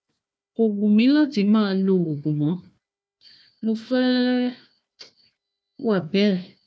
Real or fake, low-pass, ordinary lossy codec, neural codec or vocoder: fake; none; none; codec, 16 kHz, 1 kbps, FunCodec, trained on Chinese and English, 50 frames a second